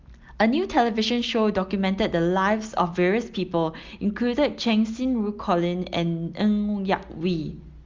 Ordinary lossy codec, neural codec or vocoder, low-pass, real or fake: Opus, 32 kbps; none; 7.2 kHz; real